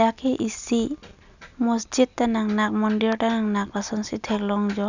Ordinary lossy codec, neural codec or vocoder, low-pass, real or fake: none; none; 7.2 kHz; real